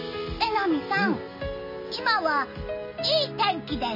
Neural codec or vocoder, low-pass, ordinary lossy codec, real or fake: none; 5.4 kHz; MP3, 24 kbps; real